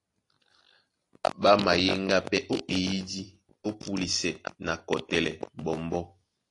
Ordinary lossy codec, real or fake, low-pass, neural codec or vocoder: AAC, 48 kbps; real; 10.8 kHz; none